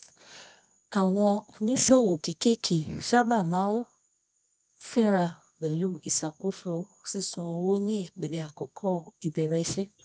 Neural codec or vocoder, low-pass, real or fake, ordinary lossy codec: codec, 24 kHz, 0.9 kbps, WavTokenizer, medium music audio release; none; fake; none